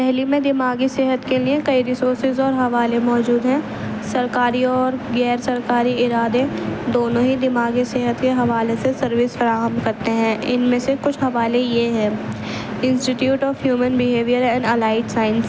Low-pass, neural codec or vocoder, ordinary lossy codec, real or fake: none; none; none; real